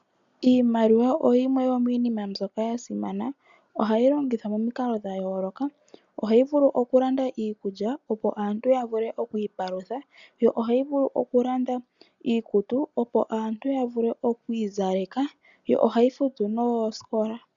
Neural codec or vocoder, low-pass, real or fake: none; 7.2 kHz; real